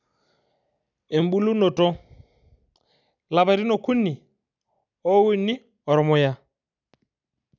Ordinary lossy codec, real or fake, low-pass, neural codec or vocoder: none; real; 7.2 kHz; none